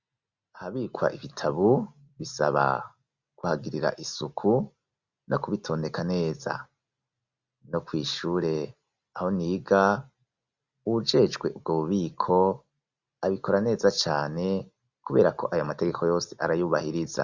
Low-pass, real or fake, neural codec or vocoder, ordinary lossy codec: 7.2 kHz; real; none; Opus, 64 kbps